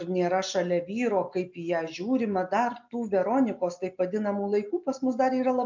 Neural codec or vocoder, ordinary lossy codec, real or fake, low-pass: none; MP3, 64 kbps; real; 7.2 kHz